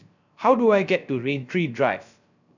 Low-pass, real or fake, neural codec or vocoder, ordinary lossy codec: 7.2 kHz; fake; codec, 16 kHz, 0.3 kbps, FocalCodec; none